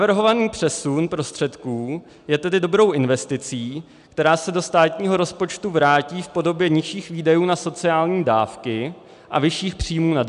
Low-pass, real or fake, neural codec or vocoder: 10.8 kHz; real; none